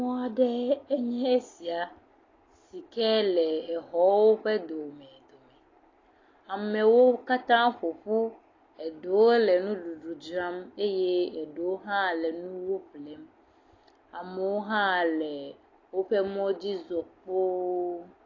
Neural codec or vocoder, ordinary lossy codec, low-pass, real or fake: none; AAC, 32 kbps; 7.2 kHz; real